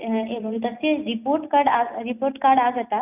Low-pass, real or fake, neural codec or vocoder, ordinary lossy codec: 3.6 kHz; real; none; none